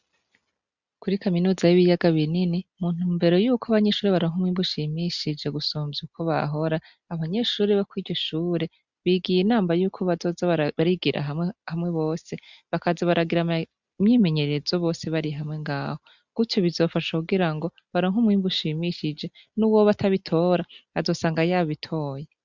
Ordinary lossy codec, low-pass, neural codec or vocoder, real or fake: Opus, 64 kbps; 7.2 kHz; none; real